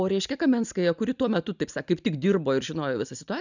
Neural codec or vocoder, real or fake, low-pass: none; real; 7.2 kHz